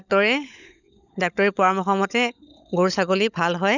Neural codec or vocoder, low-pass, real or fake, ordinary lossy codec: codec, 16 kHz, 16 kbps, FunCodec, trained on LibriTTS, 50 frames a second; 7.2 kHz; fake; none